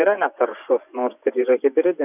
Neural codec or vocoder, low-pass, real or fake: vocoder, 24 kHz, 100 mel bands, Vocos; 3.6 kHz; fake